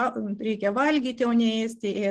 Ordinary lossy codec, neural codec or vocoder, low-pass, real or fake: Opus, 16 kbps; none; 10.8 kHz; real